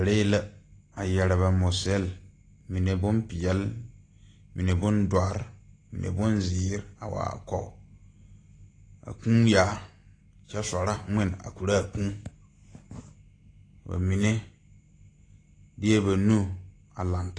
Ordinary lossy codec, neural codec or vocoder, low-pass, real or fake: AAC, 32 kbps; none; 9.9 kHz; real